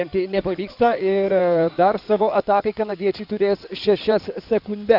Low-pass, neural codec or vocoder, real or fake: 5.4 kHz; codec, 16 kHz in and 24 kHz out, 2.2 kbps, FireRedTTS-2 codec; fake